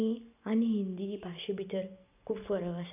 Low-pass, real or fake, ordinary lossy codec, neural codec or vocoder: 3.6 kHz; real; none; none